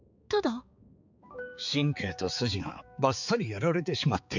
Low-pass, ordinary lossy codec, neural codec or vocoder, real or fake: 7.2 kHz; none; codec, 16 kHz, 4 kbps, X-Codec, HuBERT features, trained on balanced general audio; fake